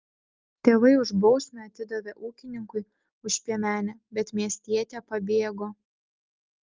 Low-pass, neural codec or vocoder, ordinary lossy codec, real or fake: 7.2 kHz; none; Opus, 32 kbps; real